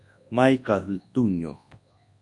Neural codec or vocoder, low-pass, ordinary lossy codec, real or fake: codec, 24 kHz, 0.9 kbps, WavTokenizer, large speech release; 10.8 kHz; AAC, 64 kbps; fake